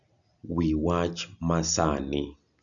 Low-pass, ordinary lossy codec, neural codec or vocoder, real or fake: 7.2 kHz; none; none; real